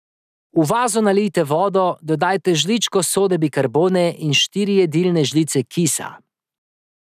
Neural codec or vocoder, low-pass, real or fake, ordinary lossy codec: none; 14.4 kHz; real; none